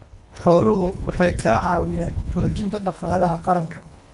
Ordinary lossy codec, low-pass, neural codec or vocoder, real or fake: none; 10.8 kHz; codec, 24 kHz, 1.5 kbps, HILCodec; fake